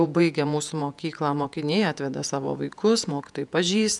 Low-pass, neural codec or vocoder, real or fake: 10.8 kHz; vocoder, 48 kHz, 128 mel bands, Vocos; fake